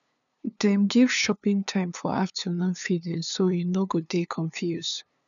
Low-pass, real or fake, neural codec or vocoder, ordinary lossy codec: 7.2 kHz; fake; codec, 16 kHz, 2 kbps, FunCodec, trained on LibriTTS, 25 frames a second; none